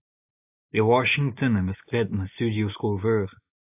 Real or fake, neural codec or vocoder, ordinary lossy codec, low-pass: real; none; AAC, 32 kbps; 3.6 kHz